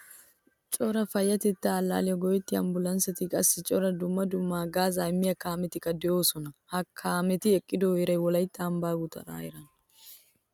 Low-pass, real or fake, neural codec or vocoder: 19.8 kHz; real; none